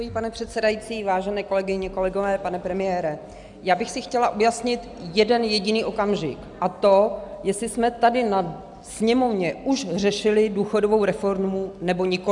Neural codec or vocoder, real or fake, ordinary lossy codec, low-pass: none; real; MP3, 96 kbps; 10.8 kHz